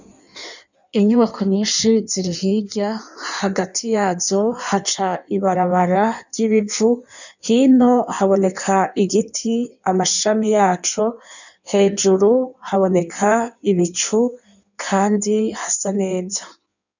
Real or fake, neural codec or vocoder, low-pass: fake; codec, 16 kHz in and 24 kHz out, 1.1 kbps, FireRedTTS-2 codec; 7.2 kHz